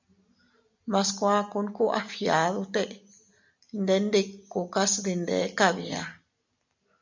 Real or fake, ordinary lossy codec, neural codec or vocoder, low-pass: real; MP3, 64 kbps; none; 7.2 kHz